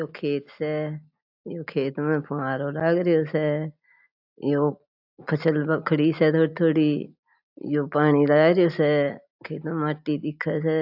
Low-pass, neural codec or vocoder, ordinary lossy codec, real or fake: 5.4 kHz; none; AAC, 48 kbps; real